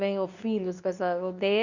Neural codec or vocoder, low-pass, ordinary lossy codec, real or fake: codec, 24 kHz, 0.9 kbps, WavTokenizer, medium speech release version 2; 7.2 kHz; none; fake